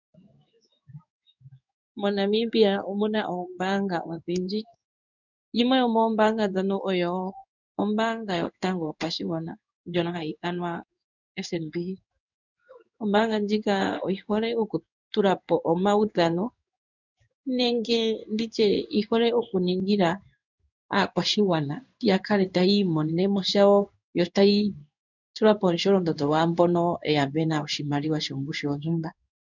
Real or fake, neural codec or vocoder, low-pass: fake; codec, 16 kHz in and 24 kHz out, 1 kbps, XY-Tokenizer; 7.2 kHz